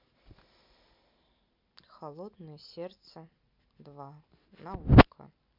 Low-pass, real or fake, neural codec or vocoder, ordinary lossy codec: 5.4 kHz; real; none; MP3, 48 kbps